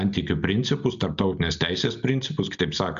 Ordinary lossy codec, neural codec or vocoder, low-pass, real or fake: AAC, 96 kbps; none; 7.2 kHz; real